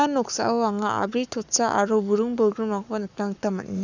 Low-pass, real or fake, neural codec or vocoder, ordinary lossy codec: 7.2 kHz; fake; codec, 44.1 kHz, 7.8 kbps, Pupu-Codec; none